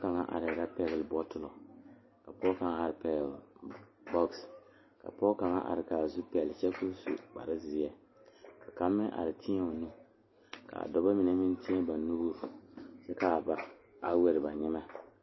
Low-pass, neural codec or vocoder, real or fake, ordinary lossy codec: 7.2 kHz; none; real; MP3, 24 kbps